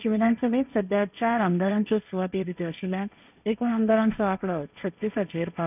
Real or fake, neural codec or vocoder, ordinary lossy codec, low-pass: fake; codec, 16 kHz, 1.1 kbps, Voila-Tokenizer; none; 3.6 kHz